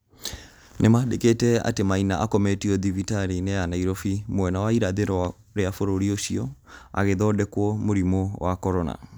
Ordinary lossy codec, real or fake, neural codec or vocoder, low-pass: none; real; none; none